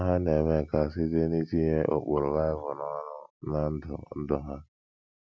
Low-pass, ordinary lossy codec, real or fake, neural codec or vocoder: none; none; real; none